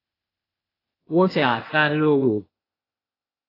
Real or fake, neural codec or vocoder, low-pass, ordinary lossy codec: fake; codec, 16 kHz, 0.8 kbps, ZipCodec; 5.4 kHz; AAC, 24 kbps